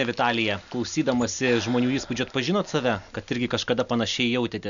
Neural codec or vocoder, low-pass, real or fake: none; 7.2 kHz; real